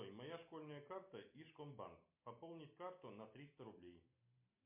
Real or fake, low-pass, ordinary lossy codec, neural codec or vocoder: real; 3.6 kHz; MP3, 32 kbps; none